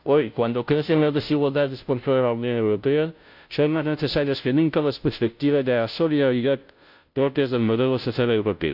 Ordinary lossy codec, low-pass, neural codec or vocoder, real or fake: AAC, 48 kbps; 5.4 kHz; codec, 16 kHz, 0.5 kbps, FunCodec, trained on Chinese and English, 25 frames a second; fake